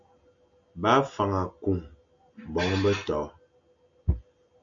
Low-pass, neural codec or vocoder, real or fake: 7.2 kHz; none; real